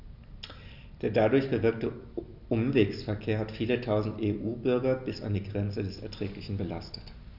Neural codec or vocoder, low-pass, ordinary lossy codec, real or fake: none; 5.4 kHz; none; real